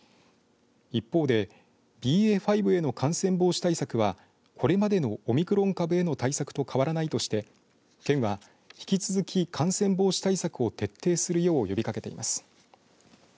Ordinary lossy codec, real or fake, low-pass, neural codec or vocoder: none; real; none; none